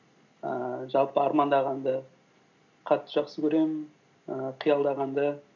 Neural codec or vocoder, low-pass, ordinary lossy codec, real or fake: none; 7.2 kHz; none; real